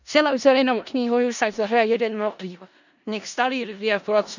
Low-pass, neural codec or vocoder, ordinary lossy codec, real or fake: 7.2 kHz; codec, 16 kHz in and 24 kHz out, 0.4 kbps, LongCat-Audio-Codec, four codebook decoder; none; fake